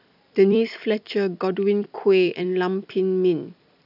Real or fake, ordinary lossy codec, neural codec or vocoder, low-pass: fake; none; vocoder, 44.1 kHz, 128 mel bands every 256 samples, BigVGAN v2; 5.4 kHz